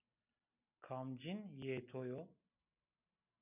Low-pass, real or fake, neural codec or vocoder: 3.6 kHz; real; none